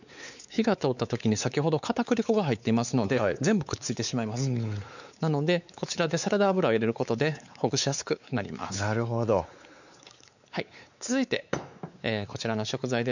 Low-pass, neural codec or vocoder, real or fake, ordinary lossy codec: 7.2 kHz; codec, 16 kHz, 4 kbps, X-Codec, WavLM features, trained on Multilingual LibriSpeech; fake; none